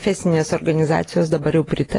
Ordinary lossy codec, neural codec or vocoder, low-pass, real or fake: AAC, 32 kbps; none; 10.8 kHz; real